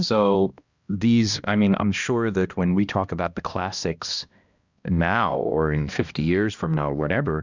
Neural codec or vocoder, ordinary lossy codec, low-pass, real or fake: codec, 16 kHz, 1 kbps, X-Codec, HuBERT features, trained on balanced general audio; Opus, 64 kbps; 7.2 kHz; fake